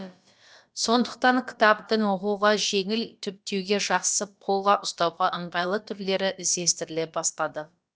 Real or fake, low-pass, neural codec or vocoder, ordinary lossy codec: fake; none; codec, 16 kHz, about 1 kbps, DyCAST, with the encoder's durations; none